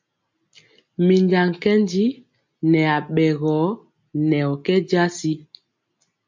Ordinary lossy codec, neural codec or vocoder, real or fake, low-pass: MP3, 64 kbps; none; real; 7.2 kHz